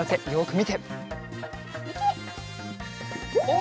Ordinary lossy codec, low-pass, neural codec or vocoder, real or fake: none; none; none; real